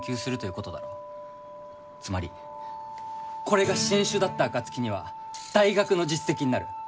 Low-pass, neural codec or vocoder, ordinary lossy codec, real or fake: none; none; none; real